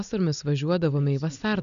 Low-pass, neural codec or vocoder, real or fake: 7.2 kHz; none; real